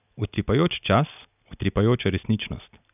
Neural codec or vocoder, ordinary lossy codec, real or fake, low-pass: none; none; real; 3.6 kHz